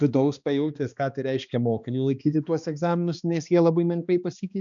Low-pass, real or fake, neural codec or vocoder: 7.2 kHz; fake; codec, 16 kHz, 2 kbps, X-Codec, HuBERT features, trained on balanced general audio